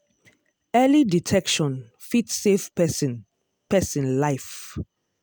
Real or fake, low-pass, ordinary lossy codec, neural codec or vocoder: real; none; none; none